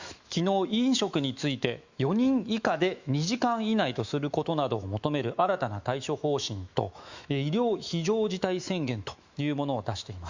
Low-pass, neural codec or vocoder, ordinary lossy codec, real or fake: 7.2 kHz; autoencoder, 48 kHz, 128 numbers a frame, DAC-VAE, trained on Japanese speech; Opus, 64 kbps; fake